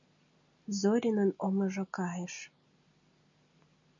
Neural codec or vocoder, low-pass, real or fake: none; 7.2 kHz; real